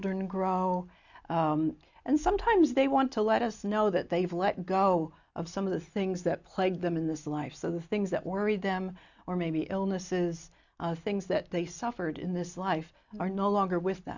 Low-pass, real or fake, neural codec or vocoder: 7.2 kHz; real; none